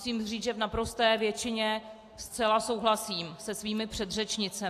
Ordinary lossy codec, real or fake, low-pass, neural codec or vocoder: AAC, 64 kbps; real; 14.4 kHz; none